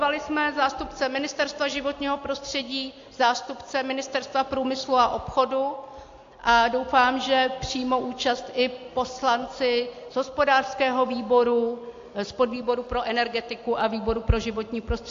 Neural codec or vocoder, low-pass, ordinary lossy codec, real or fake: none; 7.2 kHz; AAC, 48 kbps; real